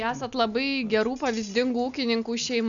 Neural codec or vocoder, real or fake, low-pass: none; real; 7.2 kHz